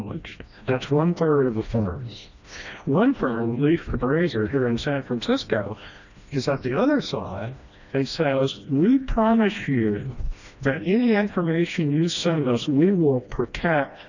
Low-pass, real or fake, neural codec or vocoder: 7.2 kHz; fake; codec, 16 kHz, 1 kbps, FreqCodec, smaller model